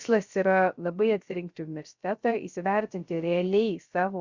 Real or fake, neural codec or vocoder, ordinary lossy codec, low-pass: fake; codec, 16 kHz, 0.3 kbps, FocalCodec; Opus, 64 kbps; 7.2 kHz